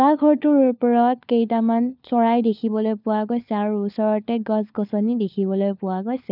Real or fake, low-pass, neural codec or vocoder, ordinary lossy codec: fake; 5.4 kHz; codec, 16 kHz, 4 kbps, FunCodec, trained on LibriTTS, 50 frames a second; none